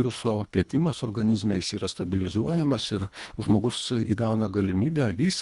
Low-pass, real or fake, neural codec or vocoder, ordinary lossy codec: 10.8 kHz; fake; codec, 24 kHz, 1.5 kbps, HILCodec; Opus, 64 kbps